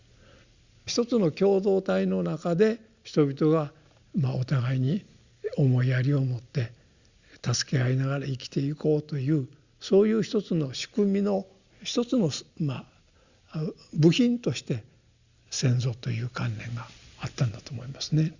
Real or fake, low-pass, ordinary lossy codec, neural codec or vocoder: real; 7.2 kHz; Opus, 64 kbps; none